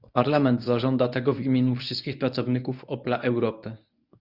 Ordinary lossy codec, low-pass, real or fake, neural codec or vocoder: Opus, 64 kbps; 5.4 kHz; fake; codec, 24 kHz, 0.9 kbps, WavTokenizer, medium speech release version 1